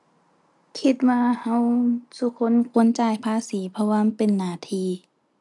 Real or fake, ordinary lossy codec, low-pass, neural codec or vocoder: real; none; 10.8 kHz; none